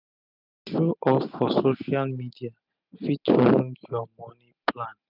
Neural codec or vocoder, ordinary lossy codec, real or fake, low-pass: none; none; real; 5.4 kHz